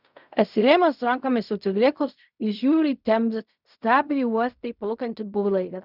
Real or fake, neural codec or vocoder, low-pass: fake; codec, 16 kHz in and 24 kHz out, 0.4 kbps, LongCat-Audio-Codec, fine tuned four codebook decoder; 5.4 kHz